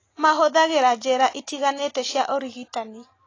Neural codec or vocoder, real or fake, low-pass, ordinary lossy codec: none; real; 7.2 kHz; AAC, 32 kbps